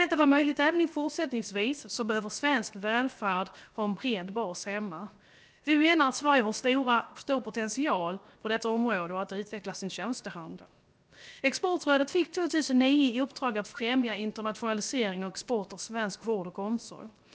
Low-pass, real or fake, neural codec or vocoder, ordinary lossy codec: none; fake; codec, 16 kHz, 0.7 kbps, FocalCodec; none